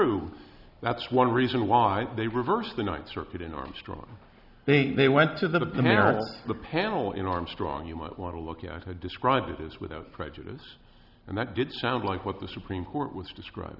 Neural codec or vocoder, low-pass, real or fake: none; 5.4 kHz; real